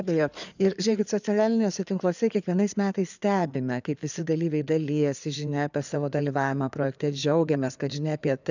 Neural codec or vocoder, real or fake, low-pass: codec, 16 kHz in and 24 kHz out, 2.2 kbps, FireRedTTS-2 codec; fake; 7.2 kHz